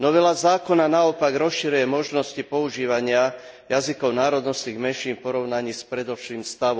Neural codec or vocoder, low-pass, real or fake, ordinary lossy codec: none; none; real; none